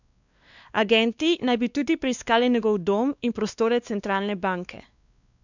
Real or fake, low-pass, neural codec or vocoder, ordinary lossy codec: fake; 7.2 kHz; codec, 16 kHz, 2 kbps, X-Codec, WavLM features, trained on Multilingual LibriSpeech; none